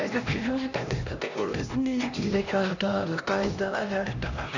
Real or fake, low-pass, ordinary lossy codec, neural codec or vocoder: fake; 7.2 kHz; none; codec, 16 kHz, 1 kbps, X-Codec, HuBERT features, trained on LibriSpeech